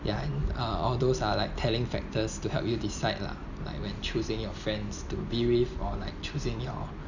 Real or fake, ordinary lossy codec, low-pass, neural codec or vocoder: real; none; 7.2 kHz; none